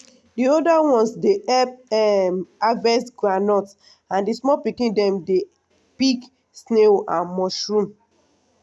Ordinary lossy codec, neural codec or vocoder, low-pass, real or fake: none; none; none; real